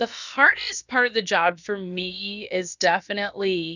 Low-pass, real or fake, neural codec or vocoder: 7.2 kHz; fake; codec, 16 kHz, about 1 kbps, DyCAST, with the encoder's durations